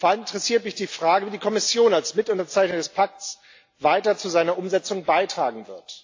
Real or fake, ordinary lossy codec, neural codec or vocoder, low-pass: real; AAC, 48 kbps; none; 7.2 kHz